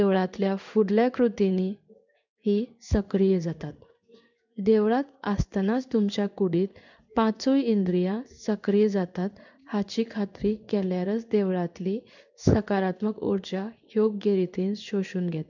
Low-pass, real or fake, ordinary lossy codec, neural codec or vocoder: 7.2 kHz; fake; none; codec, 16 kHz in and 24 kHz out, 1 kbps, XY-Tokenizer